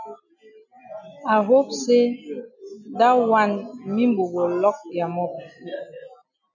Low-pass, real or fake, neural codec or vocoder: 7.2 kHz; real; none